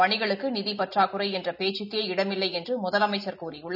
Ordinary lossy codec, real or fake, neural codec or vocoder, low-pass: none; real; none; 5.4 kHz